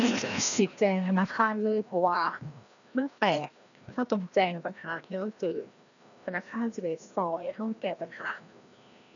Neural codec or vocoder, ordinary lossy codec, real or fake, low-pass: codec, 16 kHz, 1 kbps, FreqCodec, larger model; none; fake; 7.2 kHz